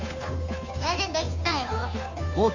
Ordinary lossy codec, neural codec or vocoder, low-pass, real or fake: AAC, 48 kbps; codec, 16 kHz, 2 kbps, FunCodec, trained on Chinese and English, 25 frames a second; 7.2 kHz; fake